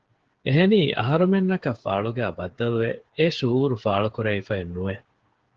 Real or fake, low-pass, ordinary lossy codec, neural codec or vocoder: fake; 7.2 kHz; Opus, 16 kbps; codec, 16 kHz, 8 kbps, FreqCodec, smaller model